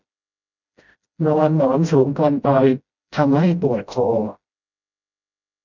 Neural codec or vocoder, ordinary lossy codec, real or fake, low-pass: codec, 16 kHz, 0.5 kbps, FreqCodec, smaller model; none; fake; 7.2 kHz